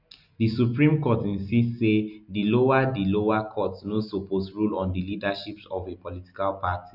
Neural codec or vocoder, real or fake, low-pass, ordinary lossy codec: none; real; 5.4 kHz; none